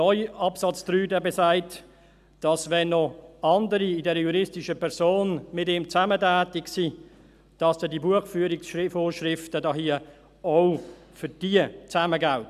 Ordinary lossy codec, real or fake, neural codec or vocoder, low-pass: none; real; none; 14.4 kHz